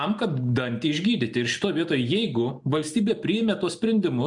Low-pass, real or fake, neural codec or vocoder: 10.8 kHz; real; none